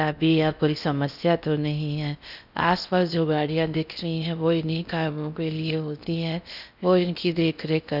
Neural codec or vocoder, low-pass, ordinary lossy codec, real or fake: codec, 16 kHz in and 24 kHz out, 0.6 kbps, FocalCodec, streaming, 4096 codes; 5.4 kHz; none; fake